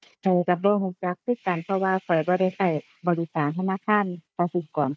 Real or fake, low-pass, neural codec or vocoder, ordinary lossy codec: fake; none; codec, 16 kHz, 4 kbps, FunCodec, trained on Chinese and English, 50 frames a second; none